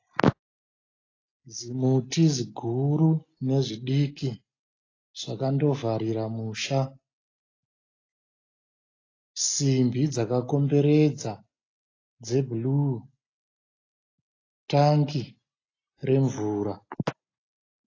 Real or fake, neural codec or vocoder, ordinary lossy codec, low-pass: real; none; AAC, 32 kbps; 7.2 kHz